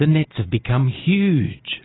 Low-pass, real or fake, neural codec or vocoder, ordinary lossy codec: 7.2 kHz; real; none; AAC, 16 kbps